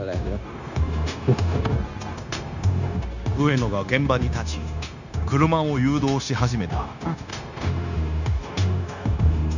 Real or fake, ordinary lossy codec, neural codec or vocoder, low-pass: fake; none; codec, 16 kHz, 0.9 kbps, LongCat-Audio-Codec; 7.2 kHz